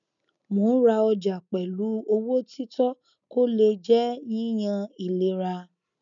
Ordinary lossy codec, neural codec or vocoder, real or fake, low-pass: none; none; real; 7.2 kHz